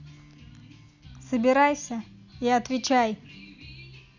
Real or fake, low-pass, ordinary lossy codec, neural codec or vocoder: real; 7.2 kHz; none; none